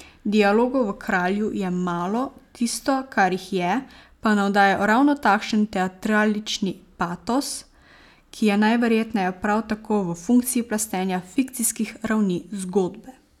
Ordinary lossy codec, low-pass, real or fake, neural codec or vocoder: none; 19.8 kHz; real; none